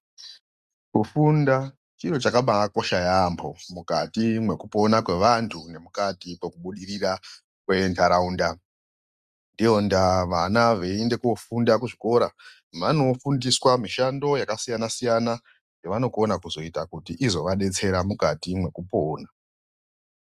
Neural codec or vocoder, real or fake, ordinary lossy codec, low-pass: none; real; Opus, 64 kbps; 14.4 kHz